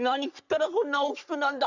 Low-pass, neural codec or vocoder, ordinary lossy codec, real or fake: 7.2 kHz; codec, 44.1 kHz, 3.4 kbps, Pupu-Codec; none; fake